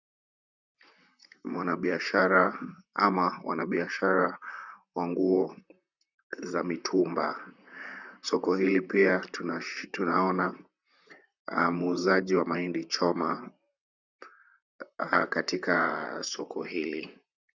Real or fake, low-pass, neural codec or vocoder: fake; 7.2 kHz; vocoder, 44.1 kHz, 128 mel bands, Pupu-Vocoder